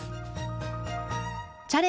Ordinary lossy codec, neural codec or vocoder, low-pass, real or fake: none; none; none; real